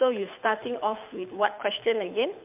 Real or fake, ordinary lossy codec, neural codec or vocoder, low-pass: fake; MP3, 32 kbps; codec, 24 kHz, 6 kbps, HILCodec; 3.6 kHz